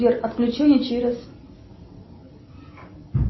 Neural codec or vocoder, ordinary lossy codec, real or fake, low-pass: none; MP3, 24 kbps; real; 7.2 kHz